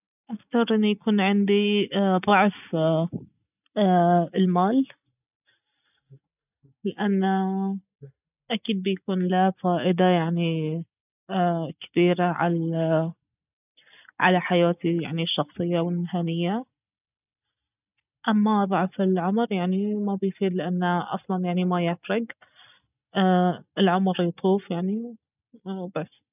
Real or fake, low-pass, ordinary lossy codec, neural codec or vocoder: real; 3.6 kHz; none; none